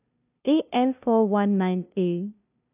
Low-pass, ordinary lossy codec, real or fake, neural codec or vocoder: 3.6 kHz; none; fake; codec, 16 kHz, 0.5 kbps, FunCodec, trained on LibriTTS, 25 frames a second